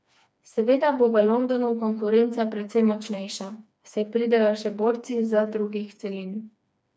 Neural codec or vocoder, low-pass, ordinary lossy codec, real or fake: codec, 16 kHz, 2 kbps, FreqCodec, smaller model; none; none; fake